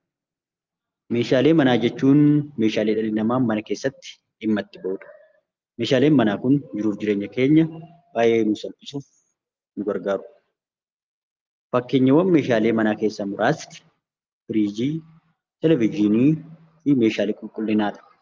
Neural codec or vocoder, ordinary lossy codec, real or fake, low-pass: none; Opus, 24 kbps; real; 7.2 kHz